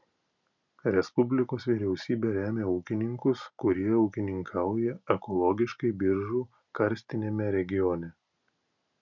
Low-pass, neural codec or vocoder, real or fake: 7.2 kHz; none; real